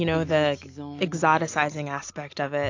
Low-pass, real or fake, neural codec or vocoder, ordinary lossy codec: 7.2 kHz; real; none; AAC, 48 kbps